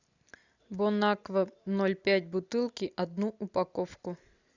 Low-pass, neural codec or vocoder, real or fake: 7.2 kHz; none; real